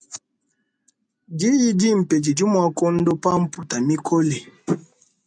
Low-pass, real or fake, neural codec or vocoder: 9.9 kHz; real; none